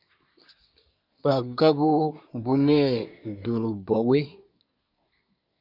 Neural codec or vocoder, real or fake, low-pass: codec, 24 kHz, 1 kbps, SNAC; fake; 5.4 kHz